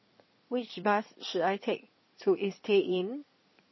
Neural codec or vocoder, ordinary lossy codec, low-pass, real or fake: codec, 16 kHz in and 24 kHz out, 2.2 kbps, FireRedTTS-2 codec; MP3, 24 kbps; 7.2 kHz; fake